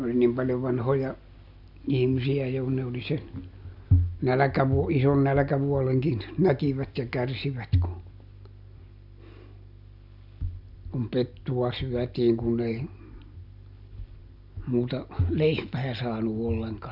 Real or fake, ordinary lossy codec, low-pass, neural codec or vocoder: real; none; 5.4 kHz; none